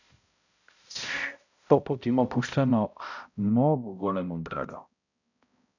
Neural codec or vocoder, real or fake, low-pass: codec, 16 kHz, 0.5 kbps, X-Codec, HuBERT features, trained on balanced general audio; fake; 7.2 kHz